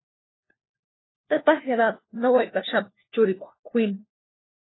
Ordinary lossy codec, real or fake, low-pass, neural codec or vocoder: AAC, 16 kbps; fake; 7.2 kHz; codec, 16 kHz, 1 kbps, FunCodec, trained on LibriTTS, 50 frames a second